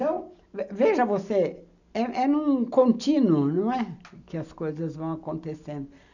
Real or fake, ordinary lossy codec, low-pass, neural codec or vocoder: real; none; 7.2 kHz; none